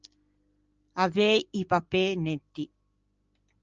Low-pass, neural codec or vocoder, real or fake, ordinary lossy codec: 7.2 kHz; none; real; Opus, 24 kbps